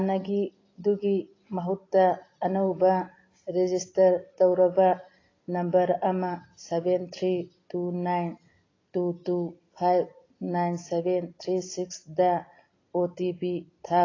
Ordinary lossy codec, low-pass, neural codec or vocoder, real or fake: AAC, 32 kbps; 7.2 kHz; none; real